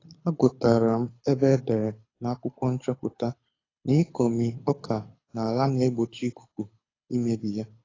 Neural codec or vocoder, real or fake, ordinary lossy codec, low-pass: codec, 24 kHz, 6 kbps, HILCodec; fake; AAC, 32 kbps; 7.2 kHz